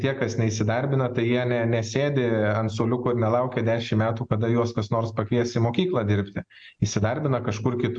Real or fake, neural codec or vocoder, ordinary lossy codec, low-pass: real; none; MP3, 64 kbps; 9.9 kHz